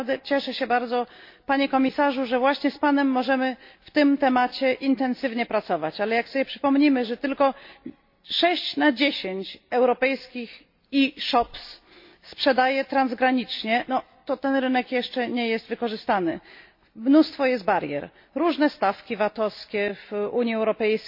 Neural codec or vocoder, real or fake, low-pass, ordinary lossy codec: none; real; 5.4 kHz; MP3, 32 kbps